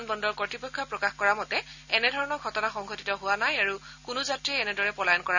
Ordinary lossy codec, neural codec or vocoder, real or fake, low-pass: none; none; real; 7.2 kHz